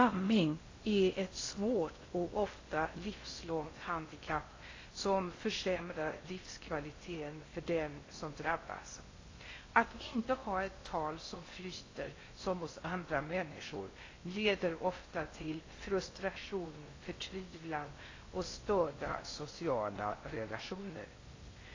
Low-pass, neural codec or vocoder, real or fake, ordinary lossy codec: 7.2 kHz; codec, 16 kHz in and 24 kHz out, 0.6 kbps, FocalCodec, streaming, 2048 codes; fake; AAC, 32 kbps